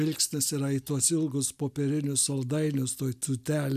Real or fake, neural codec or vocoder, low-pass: real; none; 14.4 kHz